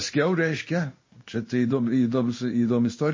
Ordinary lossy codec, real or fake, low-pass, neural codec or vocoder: MP3, 32 kbps; fake; 7.2 kHz; codec, 16 kHz in and 24 kHz out, 1 kbps, XY-Tokenizer